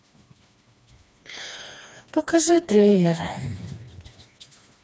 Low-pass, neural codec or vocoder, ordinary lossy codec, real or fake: none; codec, 16 kHz, 2 kbps, FreqCodec, smaller model; none; fake